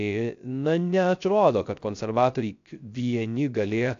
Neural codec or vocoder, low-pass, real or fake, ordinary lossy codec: codec, 16 kHz, 0.3 kbps, FocalCodec; 7.2 kHz; fake; AAC, 64 kbps